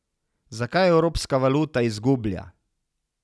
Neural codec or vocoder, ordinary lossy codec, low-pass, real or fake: none; none; none; real